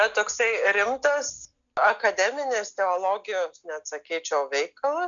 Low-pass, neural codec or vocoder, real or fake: 7.2 kHz; none; real